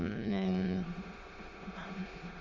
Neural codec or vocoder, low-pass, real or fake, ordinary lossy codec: autoencoder, 22.05 kHz, a latent of 192 numbers a frame, VITS, trained on many speakers; 7.2 kHz; fake; Opus, 32 kbps